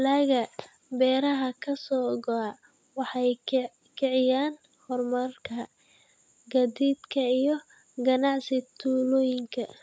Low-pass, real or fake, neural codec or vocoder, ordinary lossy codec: none; real; none; none